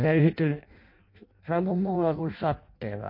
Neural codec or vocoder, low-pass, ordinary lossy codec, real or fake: codec, 16 kHz in and 24 kHz out, 0.6 kbps, FireRedTTS-2 codec; 5.4 kHz; MP3, 32 kbps; fake